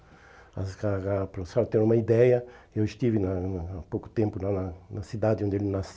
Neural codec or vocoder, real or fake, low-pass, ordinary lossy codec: none; real; none; none